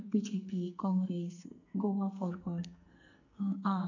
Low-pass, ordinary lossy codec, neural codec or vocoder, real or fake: 7.2 kHz; none; codec, 44.1 kHz, 2.6 kbps, SNAC; fake